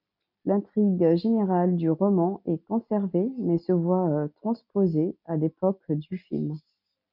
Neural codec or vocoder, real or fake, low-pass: none; real; 5.4 kHz